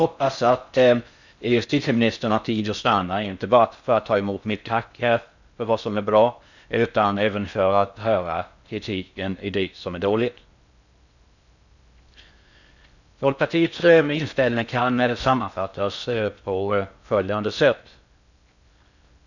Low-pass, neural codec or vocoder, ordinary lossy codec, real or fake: 7.2 kHz; codec, 16 kHz in and 24 kHz out, 0.6 kbps, FocalCodec, streaming, 4096 codes; none; fake